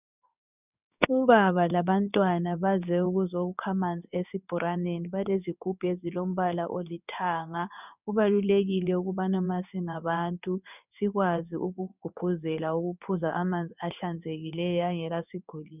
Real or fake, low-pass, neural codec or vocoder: fake; 3.6 kHz; codec, 16 kHz in and 24 kHz out, 1 kbps, XY-Tokenizer